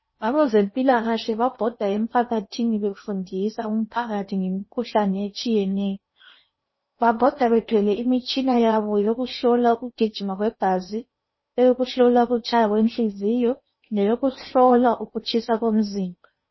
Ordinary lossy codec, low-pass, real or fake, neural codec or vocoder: MP3, 24 kbps; 7.2 kHz; fake; codec, 16 kHz in and 24 kHz out, 0.8 kbps, FocalCodec, streaming, 65536 codes